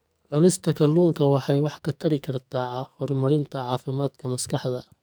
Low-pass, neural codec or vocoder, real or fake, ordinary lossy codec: none; codec, 44.1 kHz, 2.6 kbps, SNAC; fake; none